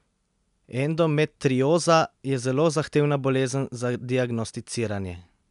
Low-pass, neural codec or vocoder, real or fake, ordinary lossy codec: 10.8 kHz; none; real; MP3, 96 kbps